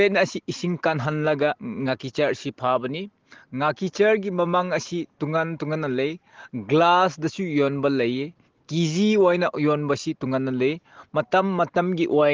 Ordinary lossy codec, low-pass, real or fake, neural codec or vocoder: Opus, 16 kbps; 7.2 kHz; real; none